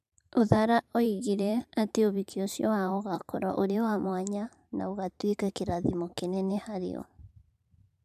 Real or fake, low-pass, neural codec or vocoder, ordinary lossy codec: fake; 14.4 kHz; vocoder, 44.1 kHz, 128 mel bands every 512 samples, BigVGAN v2; none